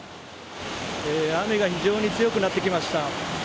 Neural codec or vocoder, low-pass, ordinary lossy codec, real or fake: none; none; none; real